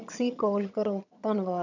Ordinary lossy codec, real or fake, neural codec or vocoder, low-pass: none; fake; vocoder, 22.05 kHz, 80 mel bands, HiFi-GAN; 7.2 kHz